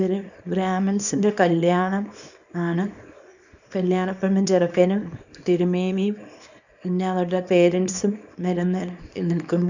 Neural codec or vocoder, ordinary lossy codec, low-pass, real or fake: codec, 24 kHz, 0.9 kbps, WavTokenizer, small release; none; 7.2 kHz; fake